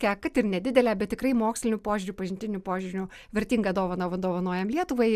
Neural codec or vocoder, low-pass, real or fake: none; 14.4 kHz; real